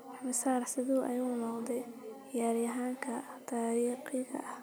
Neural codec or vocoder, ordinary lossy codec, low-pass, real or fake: none; none; none; real